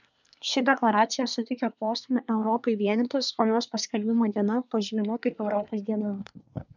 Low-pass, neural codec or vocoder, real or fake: 7.2 kHz; codec, 24 kHz, 1 kbps, SNAC; fake